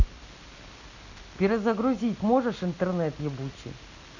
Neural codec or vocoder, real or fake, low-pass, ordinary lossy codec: none; real; 7.2 kHz; none